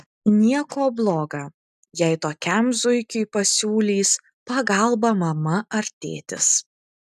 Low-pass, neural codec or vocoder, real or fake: 14.4 kHz; none; real